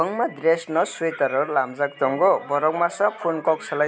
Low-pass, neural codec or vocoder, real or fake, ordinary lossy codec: none; none; real; none